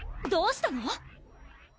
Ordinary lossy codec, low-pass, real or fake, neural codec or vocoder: none; none; real; none